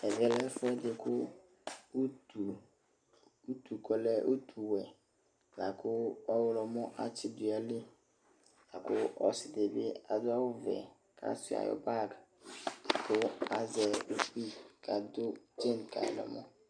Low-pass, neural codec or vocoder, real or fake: 9.9 kHz; none; real